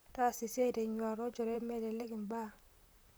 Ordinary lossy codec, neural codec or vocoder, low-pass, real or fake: none; vocoder, 44.1 kHz, 128 mel bands, Pupu-Vocoder; none; fake